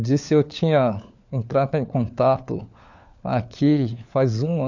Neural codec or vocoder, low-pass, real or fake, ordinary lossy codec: codec, 16 kHz, 4 kbps, FunCodec, trained on LibriTTS, 50 frames a second; 7.2 kHz; fake; none